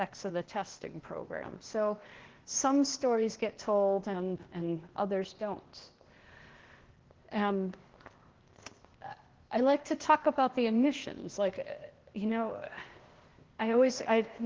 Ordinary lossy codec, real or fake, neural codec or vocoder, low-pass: Opus, 16 kbps; fake; codec, 16 kHz, 0.8 kbps, ZipCodec; 7.2 kHz